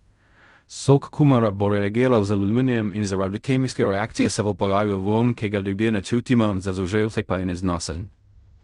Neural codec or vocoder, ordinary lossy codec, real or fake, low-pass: codec, 16 kHz in and 24 kHz out, 0.4 kbps, LongCat-Audio-Codec, fine tuned four codebook decoder; Opus, 64 kbps; fake; 10.8 kHz